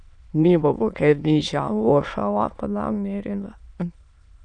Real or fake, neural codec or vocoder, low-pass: fake; autoencoder, 22.05 kHz, a latent of 192 numbers a frame, VITS, trained on many speakers; 9.9 kHz